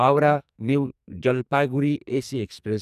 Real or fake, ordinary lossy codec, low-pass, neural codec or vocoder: fake; none; 14.4 kHz; codec, 44.1 kHz, 2.6 kbps, SNAC